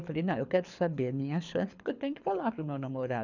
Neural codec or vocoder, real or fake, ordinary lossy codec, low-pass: codec, 24 kHz, 6 kbps, HILCodec; fake; none; 7.2 kHz